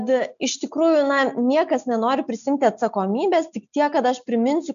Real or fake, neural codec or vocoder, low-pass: real; none; 7.2 kHz